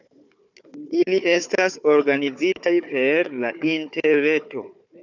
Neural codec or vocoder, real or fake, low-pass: codec, 16 kHz, 4 kbps, FunCodec, trained on Chinese and English, 50 frames a second; fake; 7.2 kHz